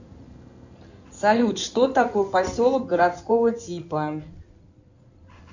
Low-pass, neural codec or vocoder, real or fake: 7.2 kHz; codec, 16 kHz in and 24 kHz out, 2.2 kbps, FireRedTTS-2 codec; fake